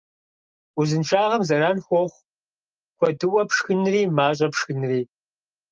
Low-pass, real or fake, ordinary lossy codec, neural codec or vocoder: 7.2 kHz; real; Opus, 24 kbps; none